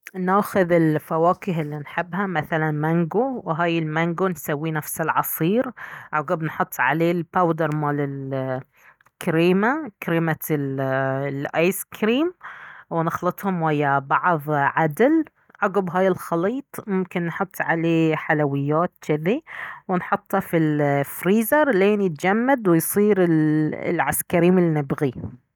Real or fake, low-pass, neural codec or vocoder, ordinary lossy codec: real; 19.8 kHz; none; none